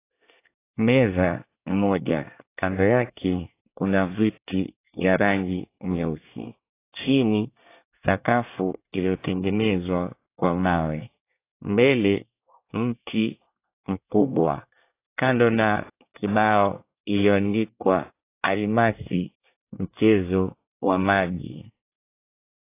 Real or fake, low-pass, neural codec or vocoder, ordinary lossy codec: fake; 3.6 kHz; codec, 24 kHz, 1 kbps, SNAC; AAC, 24 kbps